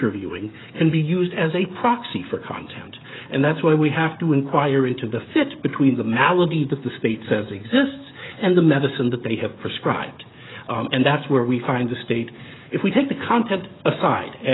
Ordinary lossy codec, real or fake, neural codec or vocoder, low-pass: AAC, 16 kbps; fake; codec, 16 kHz, 16 kbps, FreqCodec, smaller model; 7.2 kHz